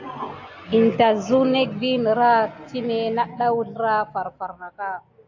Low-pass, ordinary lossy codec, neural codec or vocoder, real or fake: 7.2 kHz; AAC, 48 kbps; none; real